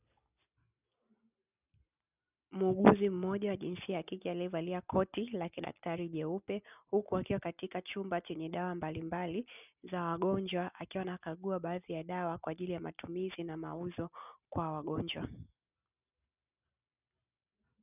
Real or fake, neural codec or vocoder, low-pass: real; none; 3.6 kHz